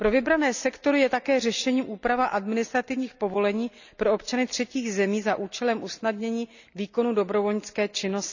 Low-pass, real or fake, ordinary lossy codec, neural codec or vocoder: 7.2 kHz; real; none; none